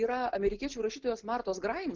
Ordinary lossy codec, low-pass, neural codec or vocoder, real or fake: Opus, 16 kbps; 7.2 kHz; none; real